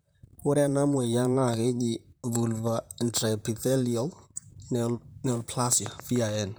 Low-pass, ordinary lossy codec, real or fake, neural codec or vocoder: none; none; fake; vocoder, 44.1 kHz, 128 mel bands, Pupu-Vocoder